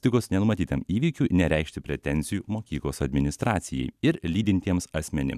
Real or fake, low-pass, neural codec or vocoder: fake; 14.4 kHz; vocoder, 44.1 kHz, 128 mel bands every 256 samples, BigVGAN v2